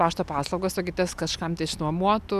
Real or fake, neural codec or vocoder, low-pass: real; none; 14.4 kHz